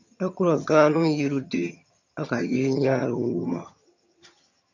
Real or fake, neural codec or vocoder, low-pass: fake; vocoder, 22.05 kHz, 80 mel bands, HiFi-GAN; 7.2 kHz